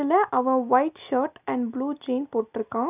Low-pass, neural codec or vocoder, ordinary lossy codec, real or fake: 3.6 kHz; none; none; real